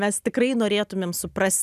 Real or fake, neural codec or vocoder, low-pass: real; none; 14.4 kHz